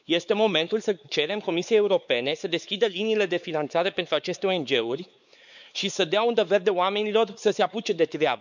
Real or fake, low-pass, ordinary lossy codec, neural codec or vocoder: fake; 7.2 kHz; none; codec, 16 kHz, 4 kbps, X-Codec, WavLM features, trained on Multilingual LibriSpeech